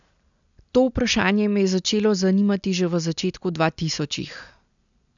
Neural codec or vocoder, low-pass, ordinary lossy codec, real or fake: none; 7.2 kHz; none; real